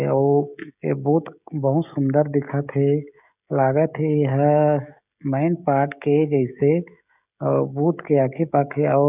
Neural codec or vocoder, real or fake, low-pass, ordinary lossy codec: codec, 16 kHz, 16 kbps, FreqCodec, smaller model; fake; 3.6 kHz; none